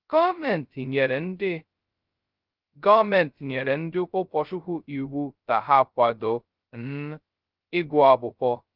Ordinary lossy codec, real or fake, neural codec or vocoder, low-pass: Opus, 24 kbps; fake; codec, 16 kHz, 0.2 kbps, FocalCodec; 5.4 kHz